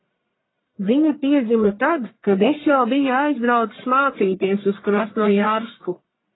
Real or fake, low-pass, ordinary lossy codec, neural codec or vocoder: fake; 7.2 kHz; AAC, 16 kbps; codec, 44.1 kHz, 1.7 kbps, Pupu-Codec